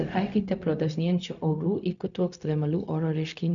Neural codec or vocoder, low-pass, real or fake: codec, 16 kHz, 0.4 kbps, LongCat-Audio-Codec; 7.2 kHz; fake